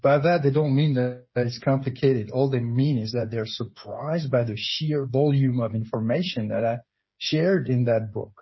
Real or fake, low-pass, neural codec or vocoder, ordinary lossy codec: fake; 7.2 kHz; codec, 16 kHz, 8 kbps, FreqCodec, smaller model; MP3, 24 kbps